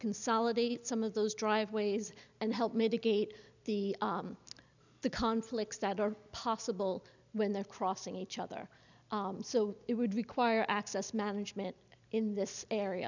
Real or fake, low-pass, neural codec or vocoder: real; 7.2 kHz; none